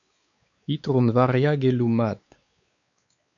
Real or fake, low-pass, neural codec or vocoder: fake; 7.2 kHz; codec, 16 kHz, 4 kbps, X-Codec, WavLM features, trained on Multilingual LibriSpeech